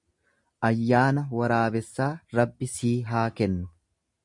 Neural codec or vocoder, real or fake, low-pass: none; real; 10.8 kHz